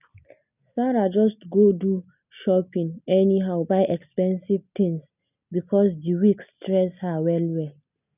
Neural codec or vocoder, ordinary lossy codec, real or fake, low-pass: none; none; real; 3.6 kHz